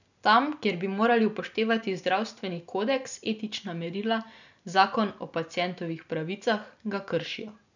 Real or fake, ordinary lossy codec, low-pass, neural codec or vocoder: real; none; 7.2 kHz; none